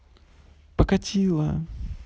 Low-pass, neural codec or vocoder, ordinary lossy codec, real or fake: none; none; none; real